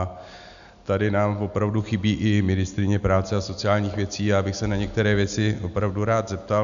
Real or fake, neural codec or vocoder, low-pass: real; none; 7.2 kHz